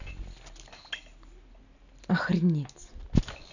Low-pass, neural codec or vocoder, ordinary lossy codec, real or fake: 7.2 kHz; none; none; real